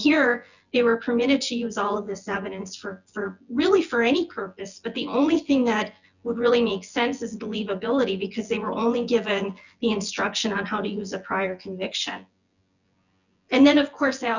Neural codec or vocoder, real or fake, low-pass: vocoder, 24 kHz, 100 mel bands, Vocos; fake; 7.2 kHz